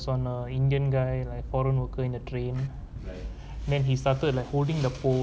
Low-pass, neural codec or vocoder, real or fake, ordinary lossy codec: none; none; real; none